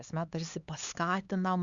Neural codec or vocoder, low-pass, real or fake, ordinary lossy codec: codec, 16 kHz, 4 kbps, X-Codec, HuBERT features, trained on LibriSpeech; 7.2 kHz; fake; Opus, 64 kbps